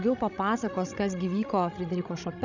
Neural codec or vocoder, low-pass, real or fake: codec, 16 kHz, 16 kbps, FreqCodec, larger model; 7.2 kHz; fake